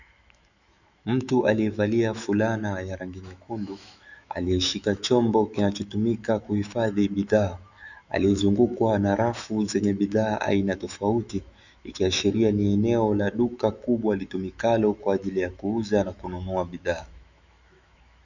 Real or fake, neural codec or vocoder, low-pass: fake; autoencoder, 48 kHz, 128 numbers a frame, DAC-VAE, trained on Japanese speech; 7.2 kHz